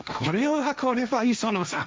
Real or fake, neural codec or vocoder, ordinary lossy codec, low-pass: fake; codec, 16 kHz, 1.1 kbps, Voila-Tokenizer; none; none